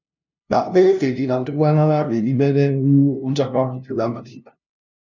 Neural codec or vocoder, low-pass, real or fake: codec, 16 kHz, 0.5 kbps, FunCodec, trained on LibriTTS, 25 frames a second; 7.2 kHz; fake